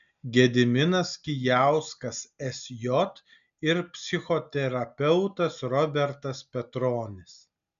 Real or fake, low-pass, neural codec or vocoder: real; 7.2 kHz; none